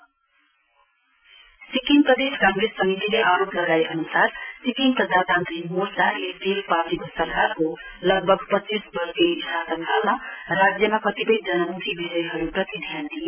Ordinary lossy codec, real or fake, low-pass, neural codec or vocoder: none; real; 3.6 kHz; none